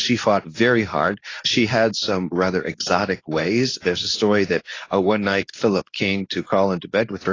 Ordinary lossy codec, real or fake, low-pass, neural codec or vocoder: AAC, 32 kbps; fake; 7.2 kHz; codec, 24 kHz, 0.9 kbps, WavTokenizer, medium speech release version 2